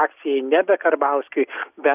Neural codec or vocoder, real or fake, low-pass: none; real; 3.6 kHz